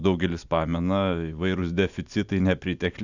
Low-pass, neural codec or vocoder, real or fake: 7.2 kHz; none; real